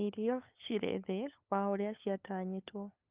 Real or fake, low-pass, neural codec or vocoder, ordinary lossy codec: fake; 3.6 kHz; codec, 16 kHz, 8 kbps, FunCodec, trained on LibriTTS, 25 frames a second; Opus, 24 kbps